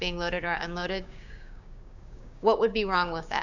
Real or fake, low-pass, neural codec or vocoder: fake; 7.2 kHz; codec, 16 kHz, 6 kbps, DAC